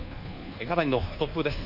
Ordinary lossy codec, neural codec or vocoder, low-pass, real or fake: none; codec, 24 kHz, 1.2 kbps, DualCodec; 5.4 kHz; fake